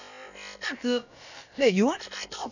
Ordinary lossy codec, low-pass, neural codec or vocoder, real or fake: none; 7.2 kHz; codec, 16 kHz, about 1 kbps, DyCAST, with the encoder's durations; fake